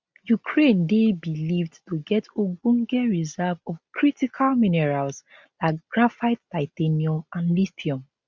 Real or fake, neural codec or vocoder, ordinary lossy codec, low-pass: real; none; none; none